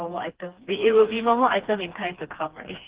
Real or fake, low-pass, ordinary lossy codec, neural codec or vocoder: fake; 3.6 kHz; Opus, 16 kbps; codec, 16 kHz, 2 kbps, FreqCodec, smaller model